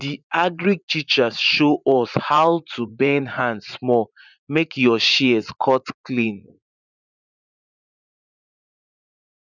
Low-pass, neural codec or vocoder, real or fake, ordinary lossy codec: 7.2 kHz; vocoder, 44.1 kHz, 80 mel bands, Vocos; fake; none